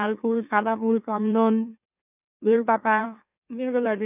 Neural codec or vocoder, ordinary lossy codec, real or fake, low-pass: autoencoder, 44.1 kHz, a latent of 192 numbers a frame, MeloTTS; none; fake; 3.6 kHz